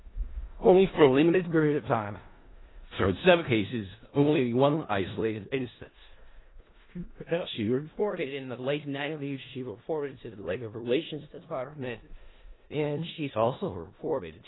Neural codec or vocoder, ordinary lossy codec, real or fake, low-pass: codec, 16 kHz in and 24 kHz out, 0.4 kbps, LongCat-Audio-Codec, four codebook decoder; AAC, 16 kbps; fake; 7.2 kHz